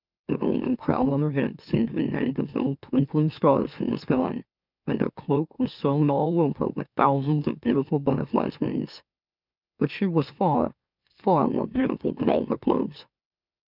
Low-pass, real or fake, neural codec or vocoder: 5.4 kHz; fake; autoencoder, 44.1 kHz, a latent of 192 numbers a frame, MeloTTS